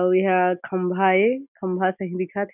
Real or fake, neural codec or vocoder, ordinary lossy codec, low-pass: real; none; none; 3.6 kHz